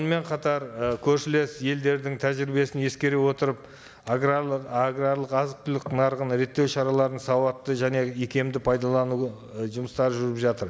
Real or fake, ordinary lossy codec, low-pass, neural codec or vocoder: real; none; none; none